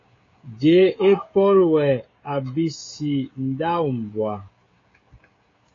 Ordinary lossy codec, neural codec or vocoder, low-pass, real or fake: AAC, 32 kbps; codec, 16 kHz, 16 kbps, FreqCodec, smaller model; 7.2 kHz; fake